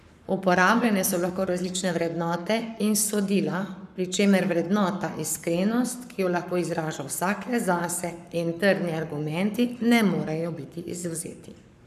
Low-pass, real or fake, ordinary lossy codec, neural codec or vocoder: 14.4 kHz; fake; none; codec, 44.1 kHz, 7.8 kbps, Pupu-Codec